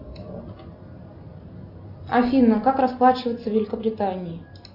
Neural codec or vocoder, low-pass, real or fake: none; 5.4 kHz; real